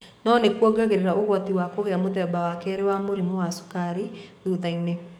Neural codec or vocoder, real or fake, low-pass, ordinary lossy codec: codec, 44.1 kHz, 7.8 kbps, DAC; fake; 19.8 kHz; none